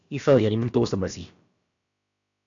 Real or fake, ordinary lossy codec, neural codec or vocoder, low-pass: fake; AAC, 64 kbps; codec, 16 kHz, about 1 kbps, DyCAST, with the encoder's durations; 7.2 kHz